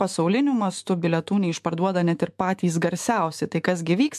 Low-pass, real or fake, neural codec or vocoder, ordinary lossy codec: 14.4 kHz; fake; autoencoder, 48 kHz, 128 numbers a frame, DAC-VAE, trained on Japanese speech; MP3, 64 kbps